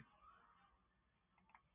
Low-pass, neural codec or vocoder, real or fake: 3.6 kHz; none; real